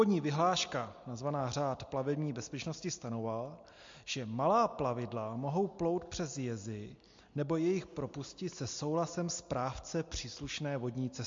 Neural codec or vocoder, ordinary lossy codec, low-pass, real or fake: none; MP3, 48 kbps; 7.2 kHz; real